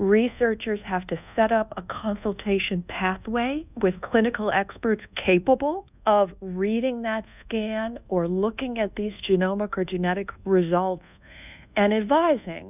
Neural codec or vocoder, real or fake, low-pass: codec, 24 kHz, 1.2 kbps, DualCodec; fake; 3.6 kHz